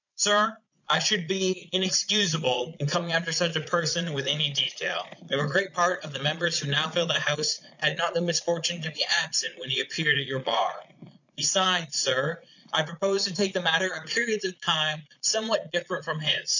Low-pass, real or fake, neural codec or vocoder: 7.2 kHz; fake; vocoder, 22.05 kHz, 80 mel bands, Vocos